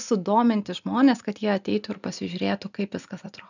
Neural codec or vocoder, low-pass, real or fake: none; 7.2 kHz; real